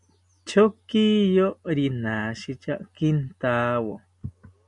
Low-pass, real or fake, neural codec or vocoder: 10.8 kHz; real; none